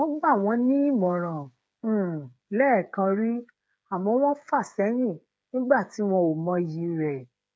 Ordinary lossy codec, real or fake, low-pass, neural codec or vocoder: none; fake; none; codec, 16 kHz, 8 kbps, FreqCodec, smaller model